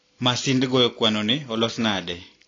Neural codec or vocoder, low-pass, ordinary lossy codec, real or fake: none; 7.2 kHz; AAC, 32 kbps; real